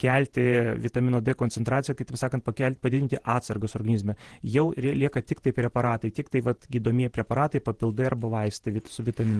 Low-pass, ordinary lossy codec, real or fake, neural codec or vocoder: 10.8 kHz; Opus, 16 kbps; fake; vocoder, 48 kHz, 128 mel bands, Vocos